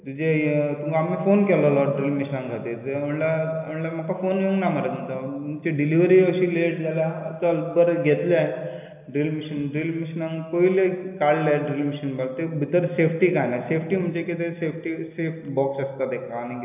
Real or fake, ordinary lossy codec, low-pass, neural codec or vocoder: real; AAC, 32 kbps; 3.6 kHz; none